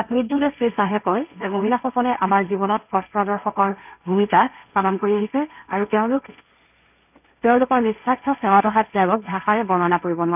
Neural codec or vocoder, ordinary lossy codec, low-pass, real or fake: codec, 16 kHz, 1.1 kbps, Voila-Tokenizer; none; 3.6 kHz; fake